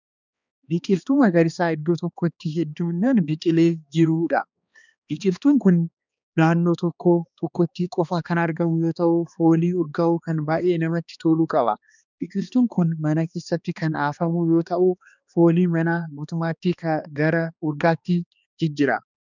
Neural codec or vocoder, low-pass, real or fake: codec, 16 kHz, 2 kbps, X-Codec, HuBERT features, trained on balanced general audio; 7.2 kHz; fake